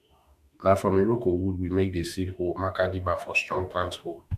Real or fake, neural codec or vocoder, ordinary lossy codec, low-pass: fake; autoencoder, 48 kHz, 32 numbers a frame, DAC-VAE, trained on Japanese speech; none; 14.4 kHz